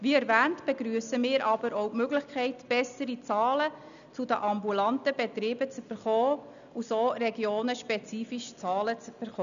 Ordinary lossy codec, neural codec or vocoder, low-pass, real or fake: none; none; 7.2 kHz; real